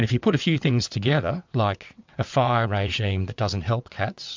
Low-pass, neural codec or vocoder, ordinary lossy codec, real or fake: 7.2 kHz; vocoder, 22.05 kHz, 80 mel bands, WaveNeXt; MP3, 64 kbps; fake